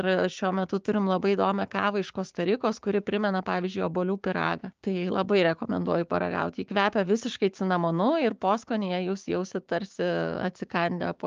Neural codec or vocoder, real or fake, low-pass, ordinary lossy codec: codec, 16 kHz, 6 kbps, DAC; fake; 7.2 kHz; Opus, 24 kbps